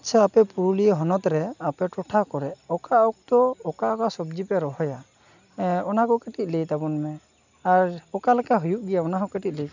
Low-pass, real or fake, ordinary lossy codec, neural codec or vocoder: 7.2 kHz; real; none; none